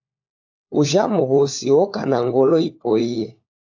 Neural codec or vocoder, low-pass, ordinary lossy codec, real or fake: codec, 16 kHz, 4 kbps, FunCodec, trained on LibriTTS, 50 frames a second; 7.2 kHz; AAC, 48 kbps; fake